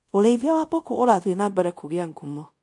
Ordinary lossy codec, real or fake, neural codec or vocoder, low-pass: MP3, 48 kbps; fake; codec, 24 kHz, 0.5 kbps, DualCodec; 10.8 kHz